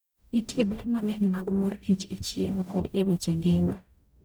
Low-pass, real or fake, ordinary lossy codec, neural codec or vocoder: none; fake; none; codec, 44.1 kHz, 0.9 kbps, DAC